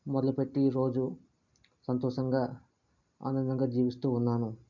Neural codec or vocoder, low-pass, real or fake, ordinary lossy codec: none; 7.2 kHz; real; none